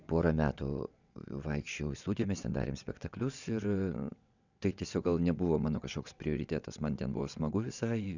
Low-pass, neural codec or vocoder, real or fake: 7.2 kHz; vocoder, 22.05 kHz, 80 mel bands, WaveNeXt; fake